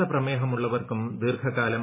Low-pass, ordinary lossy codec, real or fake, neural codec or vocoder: 3.6 kHz; MP3, 16 kbps; real; none